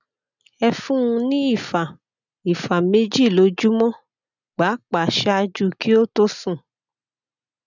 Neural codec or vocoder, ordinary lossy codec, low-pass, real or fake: none; none; 7.2 kHz; real